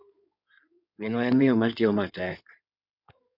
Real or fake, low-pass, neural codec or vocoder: fake; 5.4 kHz; codec, 16 kHz in and 24 kHz out, 2.2 kbps, FireRedTTS-2 codec